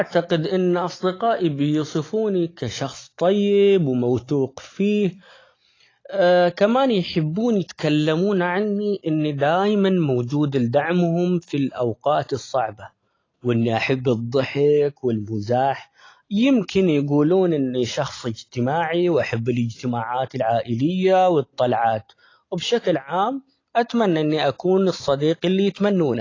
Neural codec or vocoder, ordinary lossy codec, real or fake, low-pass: none; AAC, 32 kbps; real; 7.2 kHz